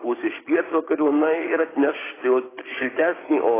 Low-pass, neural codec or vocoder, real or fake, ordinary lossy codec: 3.6 kHz; codec, 16 kHz, 6 kbps, DAC; fake; AAC, 16 kbps